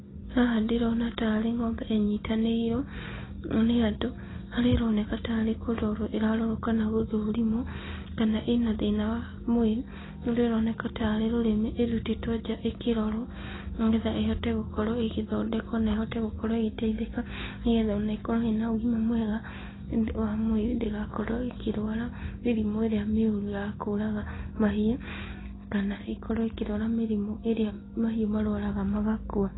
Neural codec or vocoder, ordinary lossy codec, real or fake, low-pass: none; AAC, 16 kbps; real; 7.2 kHz